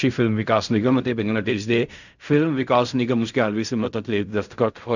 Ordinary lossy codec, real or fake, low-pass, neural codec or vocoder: none; fake; 7.2 kHz; codec, 16 kHz in and 24 kHz out, 0.4 kbps, LongCat-Audio-Codec, fine tuned four codebook decoder